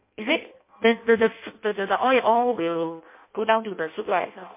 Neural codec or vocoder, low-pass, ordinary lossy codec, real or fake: codec, 16 kHz in and 24 kHz out, 0.6 kbps, FireRedTTS-2 codec; 3.6 kHz; MP3, 24 kbps; fake